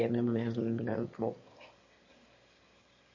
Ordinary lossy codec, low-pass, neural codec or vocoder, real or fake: MP3, 32 kbps; 7.2 kHz; autoencoder, 22.05 kHz, a latent of 192 numbers a frame, VITS, trained on one speaker; fake